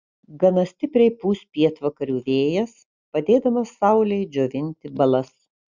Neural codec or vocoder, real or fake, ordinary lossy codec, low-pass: none; real; Opus, 64 kbps; 7.2 kHz